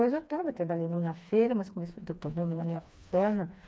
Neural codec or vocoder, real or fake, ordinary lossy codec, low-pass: codec, 16 kHz, 2 kbps, FreqCodec, smaller model; fake; none; none